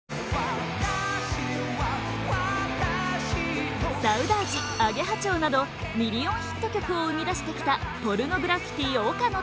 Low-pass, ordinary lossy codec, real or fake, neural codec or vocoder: none; none; real; none